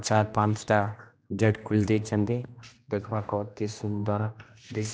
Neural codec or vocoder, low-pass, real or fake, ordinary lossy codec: codec, 16 kHz, 1 kbps, X-Codec, HuBERT features, trained on general audio; none; fake; none